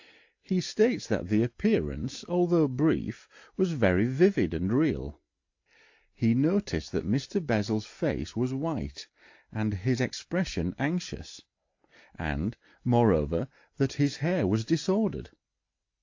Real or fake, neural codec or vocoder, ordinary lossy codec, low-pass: real; none; AAC, 48 kbps; 7.2 kHz